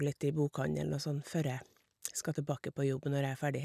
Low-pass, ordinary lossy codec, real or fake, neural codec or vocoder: 14.4 kHz; none; real; none